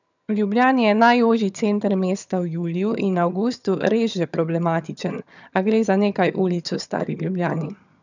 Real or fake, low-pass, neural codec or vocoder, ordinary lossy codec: fake; 7.2 kHz; vocoder, 22.05 kHz, 80 mel bands, HiFi-GAN; none